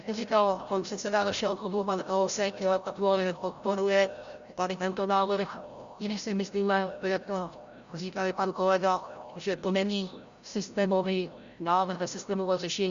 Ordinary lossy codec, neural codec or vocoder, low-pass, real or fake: Opus, 64 kbps; codec, 16 kHz, 0.5 kbps, FreqCodec, larger model; 7.2 kHz; fake